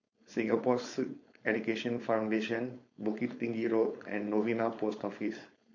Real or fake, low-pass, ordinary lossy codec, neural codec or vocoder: fake; 7.2 kHz; MP3, 48 kbps; codec, 16 kHz, 4.8 kbps, FACodec